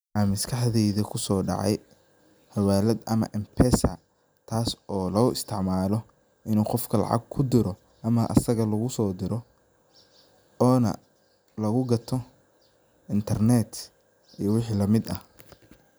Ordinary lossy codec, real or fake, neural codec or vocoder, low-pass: none; real; none; none